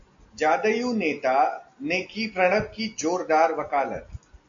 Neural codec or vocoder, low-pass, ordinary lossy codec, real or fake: none; 7.2 kHz; AAC, 64 kbps; real